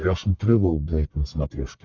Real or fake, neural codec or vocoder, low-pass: fake; codec, 44.1 kHz, 1.7 kbps, Pupu-Codec; 7.2 kHz